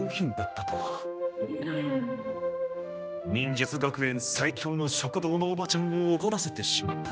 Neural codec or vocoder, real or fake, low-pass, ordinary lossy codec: codec, 16 kHz, 1 kbps, X-Codec, HuBERT features, trained on general audio; fake; none; none